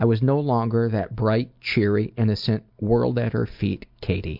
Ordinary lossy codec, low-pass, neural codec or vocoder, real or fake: MP3, 48 kbps; 5.4 kHz; none; real